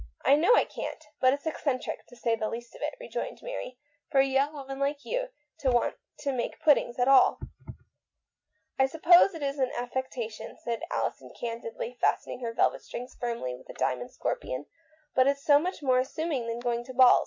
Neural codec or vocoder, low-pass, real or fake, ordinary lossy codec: none; 7.2 kHz; real; MP3, 64 kbps